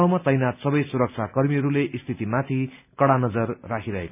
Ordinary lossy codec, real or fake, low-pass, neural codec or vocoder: none; real; 3.6 kHz; none